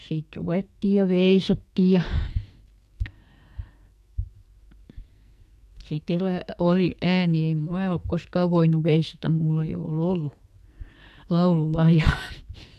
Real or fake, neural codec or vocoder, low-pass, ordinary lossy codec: fake; codec, 44.1 kHz, 2.6 kbps, SNAC; 14.4 kHz; none